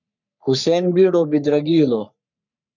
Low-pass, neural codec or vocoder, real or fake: 7.2 kHz; codec, 44.1 kHz, 3.4 kbps, Pupu-Codec; fake